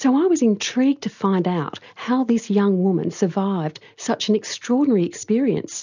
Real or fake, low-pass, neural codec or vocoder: real; 7.2 kHz; none